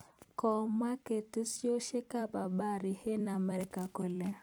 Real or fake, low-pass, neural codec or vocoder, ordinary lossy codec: fake; none; vocoder, 44.1 kHz, 128 mel bands every 256 samples, BigVGAN v2; none